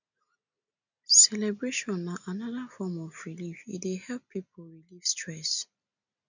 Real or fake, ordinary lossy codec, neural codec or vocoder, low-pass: real; none; none; 7.2 kHz